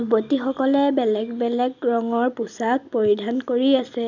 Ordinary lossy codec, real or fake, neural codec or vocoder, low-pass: none; real; none; 7.2 kHz